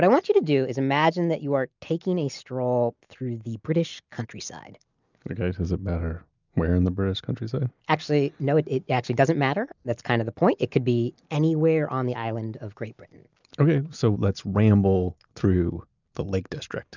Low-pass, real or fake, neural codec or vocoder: 7.2 kHz; real; none